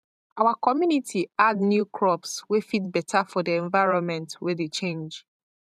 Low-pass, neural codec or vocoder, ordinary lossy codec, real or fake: 14.4 kHz; vocoder, 44.1 kHz, 128 mel bands every 512 samples, BigVGAN v2; none; fake